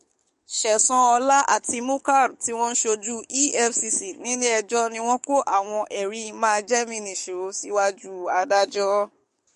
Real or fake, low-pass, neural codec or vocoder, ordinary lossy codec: fake; 14.4 kHz; codec, 44.1 kHz, 7.8 kbps, DAC; MP3, 48 kbps